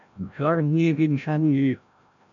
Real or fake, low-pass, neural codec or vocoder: fake; 7.2 kHz; codec, 16 kHz, 0.5 kbps, FreqCodec, larger model